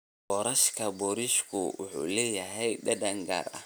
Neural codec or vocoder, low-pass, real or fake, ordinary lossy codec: none; none; real; none